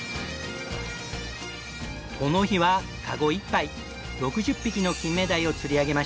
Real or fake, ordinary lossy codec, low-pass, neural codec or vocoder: real; none; none; none